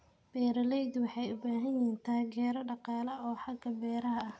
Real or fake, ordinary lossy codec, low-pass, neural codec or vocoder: real; none; none; none